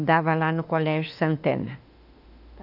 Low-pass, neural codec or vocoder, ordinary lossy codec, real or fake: 5.4 kHz; autoencoder, 48 kHz, 32 numbers a frame, DAC-VAE, trained on Japanese speech; none; fake